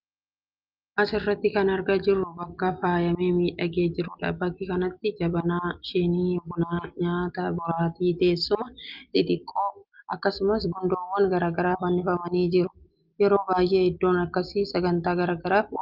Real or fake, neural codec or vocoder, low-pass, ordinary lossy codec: real; none; 5.4 kHz; Opus, 24 kbps